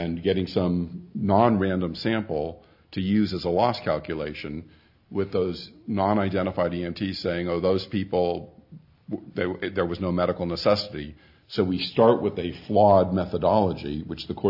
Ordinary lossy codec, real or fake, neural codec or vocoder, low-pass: AAC, 48 kbps; real; none; 5.4 kHz